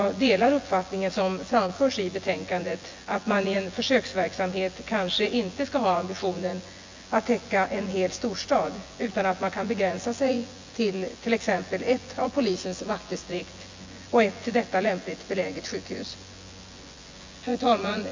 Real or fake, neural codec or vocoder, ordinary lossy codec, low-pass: fake; vocoder, 24 kHz, 100 mel bands, Vocos; MP3, 48 kbps; 7.2 kHz